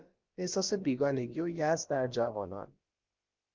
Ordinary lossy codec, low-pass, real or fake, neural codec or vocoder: Opus, 24 kbps; 7.2 kHz; fake; codec, 16 kHz, about 1 kbps, DyCAST, with the encoder's durations